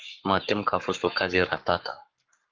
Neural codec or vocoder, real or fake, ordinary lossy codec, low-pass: codec, 44.1 kHz, 7.8 kbps, Pupu-Codec; fake; Opus, 32 kbps; 7.2 kHz